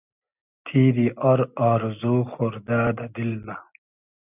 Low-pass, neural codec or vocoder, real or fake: 3.6 kHz; none; real